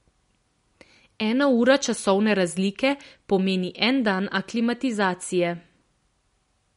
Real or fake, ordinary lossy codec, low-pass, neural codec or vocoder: real; MP3, 48 kbps; 10.8 kHz; none